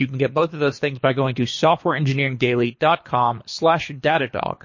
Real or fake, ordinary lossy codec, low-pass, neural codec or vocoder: fake; MP3, 32 kbps; 7.2 kHz; codec, 24 kHz, 3 kbps, HILCodec